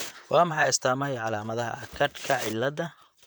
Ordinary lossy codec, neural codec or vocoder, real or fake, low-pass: none; vocoder, 44.1 kHz, 128 mel bands, Pupu-Vocoder; fake; none